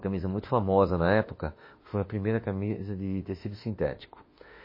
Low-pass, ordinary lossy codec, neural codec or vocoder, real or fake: 5.4 kHz; MP3, 24 kbps; autoencoder, 48 kHz, 32 numbers a frame, DAC-VAE, trained on Japanese speech; fake